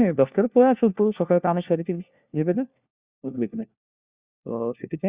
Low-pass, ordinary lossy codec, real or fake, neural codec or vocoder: 3.6 kHz; Opus, 64 kbps; fake; codec, 16 kHz, 1 kbps, FunCodec, trained on LibriTTS, 50 frames a second